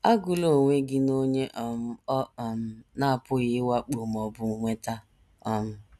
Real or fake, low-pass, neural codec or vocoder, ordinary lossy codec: real; none; none; none